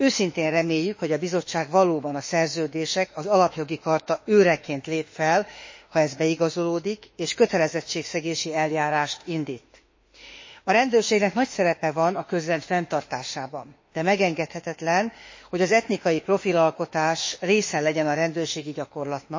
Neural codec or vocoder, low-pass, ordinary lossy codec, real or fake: autoencoder, 48 kHz, 32 numbers a frame, DAC-VAE, trained on Japanese speech; 7.2 kHz; MP3, 32 kbps; fake